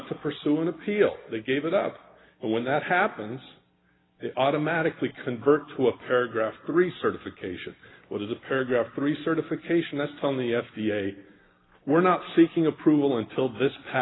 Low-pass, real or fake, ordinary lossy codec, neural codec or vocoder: 7.2 kHz; real; AAC, 16 kbps; none